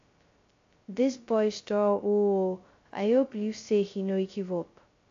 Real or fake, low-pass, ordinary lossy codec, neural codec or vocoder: fake; 7.2 kHz; AAC, 48 kbps; codec, 16 kHz, 0.2 kbps, FocalCodec